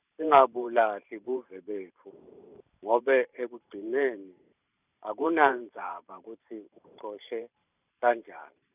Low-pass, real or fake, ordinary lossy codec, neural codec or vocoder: 3.6 kHz; fake; none; vocoder, 44.1 kHz, 128 mel bands every 512 samples, BigVGAN v2